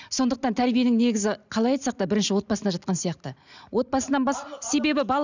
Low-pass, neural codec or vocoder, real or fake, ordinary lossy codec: 7.2 kHz; none; real; none